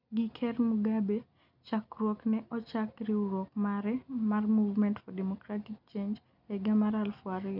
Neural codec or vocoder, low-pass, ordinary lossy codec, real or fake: none; 5.4 kHz; none; real